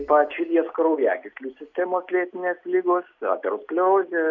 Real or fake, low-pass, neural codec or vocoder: real; 7.2 kHz; none